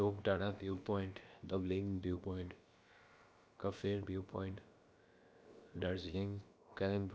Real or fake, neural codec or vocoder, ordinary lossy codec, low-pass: fake; codec, 16 kHz, about 1 kbps, DyCAST, with the encoder's durations; none; none